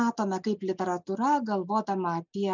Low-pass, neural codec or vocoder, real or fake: 7.2 kHz; none; real